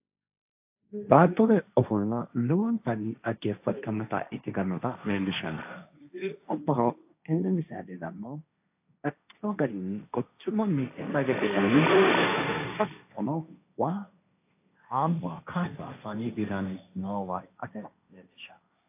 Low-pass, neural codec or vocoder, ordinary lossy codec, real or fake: 3.6 kHz; codec, 16 kHz, 1.1 kbps, Voila-Tokenizer; AAC, 32 kbps; fake